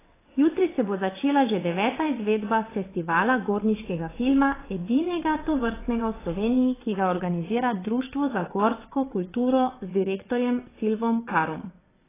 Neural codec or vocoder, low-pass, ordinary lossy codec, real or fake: vocoder, 22.05 kHz, 80 mel bands, WaveNeXt; 3.6 kHz; AAC, 16 kbps; fake